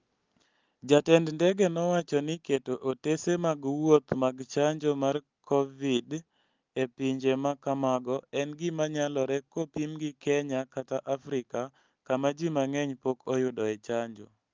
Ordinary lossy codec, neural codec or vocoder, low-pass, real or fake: Opus, 32 kbps; none; 7.2 kHz; real